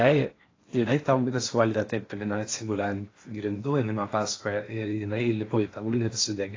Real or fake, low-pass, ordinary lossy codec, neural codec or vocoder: fake; 7.2 kHz; AAC, 32 kbps; codec, 16 kHz in and 24 kHz out, 0.8 kbps, FocalCodec, streaming, 65536 codes